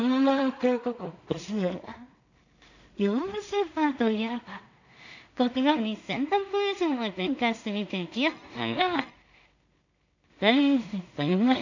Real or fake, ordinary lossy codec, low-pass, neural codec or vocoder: fake; none; 7.2 kHz; codec, 16 kHz in and 24 kHz out, 0.4 kbps, LongCat-Audio-Codec, two codebook decoder